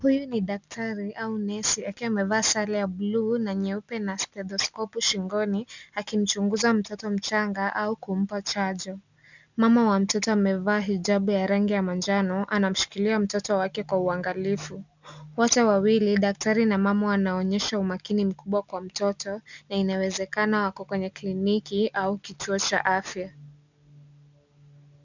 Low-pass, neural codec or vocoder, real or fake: 7.2 kHz; none; real